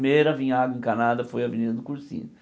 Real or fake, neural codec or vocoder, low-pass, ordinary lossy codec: real; none; none; none